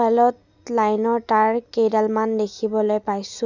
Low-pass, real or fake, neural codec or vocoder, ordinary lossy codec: 7.2 kHz; real; none; none